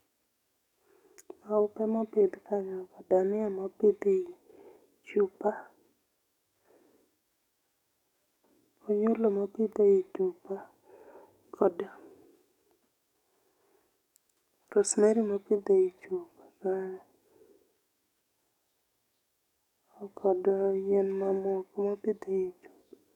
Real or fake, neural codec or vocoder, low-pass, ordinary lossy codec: fake; codec, 44.1 kHz, 7.8 kbps, DAC; 19.8 kHz; none